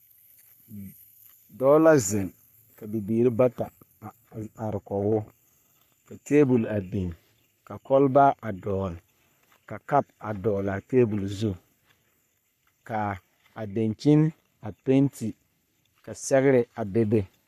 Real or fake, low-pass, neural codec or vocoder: fake; 14.4 kHz; codec, 44.1 kHz, 3.4 kbps, Pupu-Codec